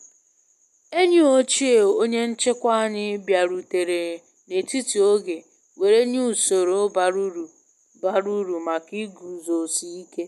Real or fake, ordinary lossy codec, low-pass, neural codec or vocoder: real; none; 14.4 kHz; none